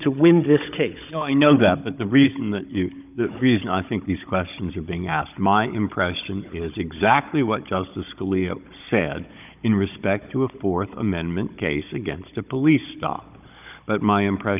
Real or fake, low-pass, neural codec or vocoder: fake; 3.6 kHz; codec, 16 kHz, 16 kbps, FunCodec, trained on Chinese and English, 50 frames a second